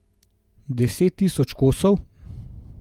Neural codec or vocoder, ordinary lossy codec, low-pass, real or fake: vocoder, 48 kHz, 128 mel bands, Vocos; Opus, 32 kbps; 19.8 kHz; fake